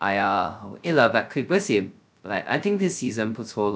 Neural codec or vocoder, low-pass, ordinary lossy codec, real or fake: codec, 16 kHz, 0.2 kbps, FocalCodec; none; none; fake